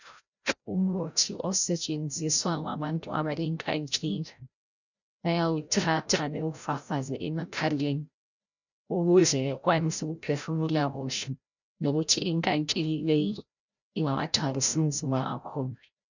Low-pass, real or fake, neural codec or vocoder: 7.2 kHz; fake; codec, 16 kHz, 0.5 kbps, FreqCodec, larger model